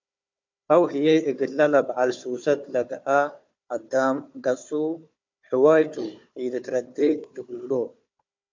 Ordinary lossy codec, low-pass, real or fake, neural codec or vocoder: MP3, 64 kbps; 7.2 kHz; fake; codec, 16 kHz, 4 kbps, FunCodec, trained on Chinese and English, 50 frames a second